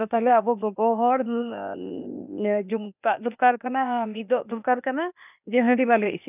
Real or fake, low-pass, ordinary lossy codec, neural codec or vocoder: fake; 3.6 kHz; none; codec, 16 kHz, 0.8 kbps, ZipCodec